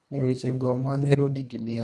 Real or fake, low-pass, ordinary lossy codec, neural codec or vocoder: fake; none; none; codec, 24 kHz, 1.5 kbps, HILCodec